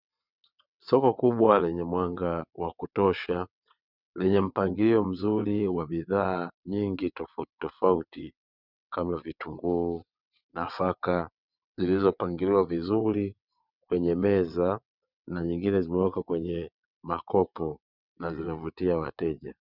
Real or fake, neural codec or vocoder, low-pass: fake; vocoder, 22.05 kHz, 80 mel bands, WaveNeXt; 5.4 kHz